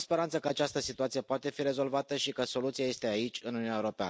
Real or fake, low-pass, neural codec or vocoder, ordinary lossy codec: real; none; none; none